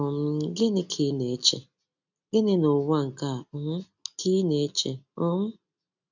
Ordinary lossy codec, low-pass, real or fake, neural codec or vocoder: none; 7.2 kHz; real; none